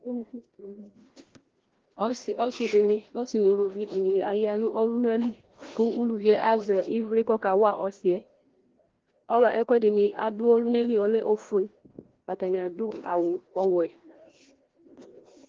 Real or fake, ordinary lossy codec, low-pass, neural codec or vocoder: fake; Opus, 16 kbps; 7.2 kHz; codec, 16 kHz, 1 kbps, FreqCodec, larger model